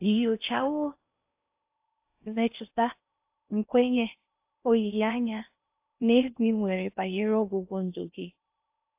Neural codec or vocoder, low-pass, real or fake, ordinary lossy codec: codec, 16 kHz in and 24 kHz out, 0.6 kbps, FocalCodec, streaming, 4096 codes; 3.6 kHz; fake; AAC, 32 kbps